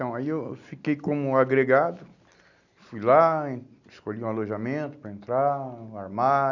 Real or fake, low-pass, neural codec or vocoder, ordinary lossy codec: real; 7.2 kHz; none; none